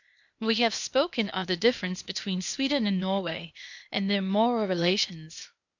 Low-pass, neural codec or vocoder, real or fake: 7.2 kHz; codec, 16 kHz, 0.8 kbps, ZipCodec; fake